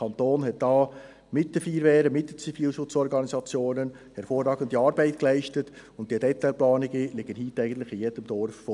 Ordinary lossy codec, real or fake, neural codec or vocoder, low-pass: none; real; none; 10.8 kHz